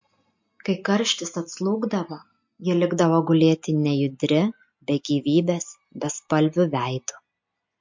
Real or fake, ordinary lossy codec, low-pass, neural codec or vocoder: real; MP3, 48 kbps; 7.2 kHz; none